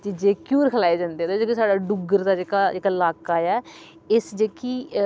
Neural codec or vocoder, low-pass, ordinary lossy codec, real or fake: none; none; none; real